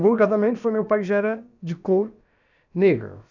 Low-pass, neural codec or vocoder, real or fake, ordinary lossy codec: 7.2 kHz; codec, 16 kHz, about 1 kbps, DyCAST, with the encoder's durations; fake; none